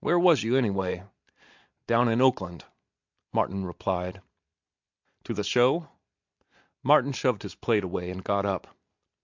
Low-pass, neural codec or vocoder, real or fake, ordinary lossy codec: 7.2 kHz; none; real; MP3, 64 kbps